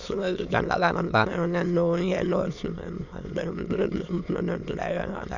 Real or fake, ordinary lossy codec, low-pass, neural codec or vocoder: fake; Opus, 64 kbps; 7.2 kHz; autoencoder, 22.05 kHz, a latent of 192 numbers a frame, VITS, trained on many speakers